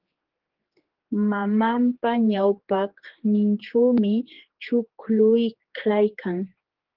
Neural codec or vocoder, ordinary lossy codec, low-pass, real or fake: codec, 16 kHz, 4 kbps, X-Codec, HuBERT features, trained on general audio; Opus, 16 kbps; 5.4 kHz; fake